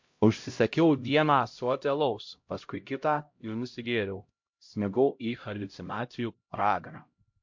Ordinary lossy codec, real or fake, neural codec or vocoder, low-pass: MP3, 48 kbps; fake; codec, 16 kHz, 0.5 kbps, X-Codec, HuBERT features, trained on LibriSpeech; 7.2 kHz